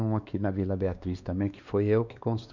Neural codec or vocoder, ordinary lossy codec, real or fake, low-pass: codec, 16 kHz, 2 kbps, X-Codec, WavLM features, trained on Multilingual LibriSpeech; none; fake; 7.2 kHz